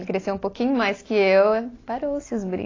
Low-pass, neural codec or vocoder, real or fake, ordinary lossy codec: 7.2 kHz; none; real; AAC, 32 kbps